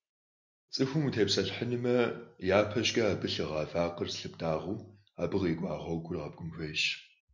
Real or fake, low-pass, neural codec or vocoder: real; 7.2 kHz; none